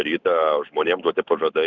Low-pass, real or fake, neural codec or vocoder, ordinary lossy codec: 7.2 kHz; real; none; Opus, 64 kbps